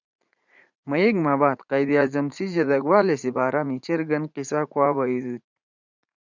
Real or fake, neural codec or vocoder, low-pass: fake; vocoder, 44.1 kHz, 80 mel bands, Vocos; 7.2 kHz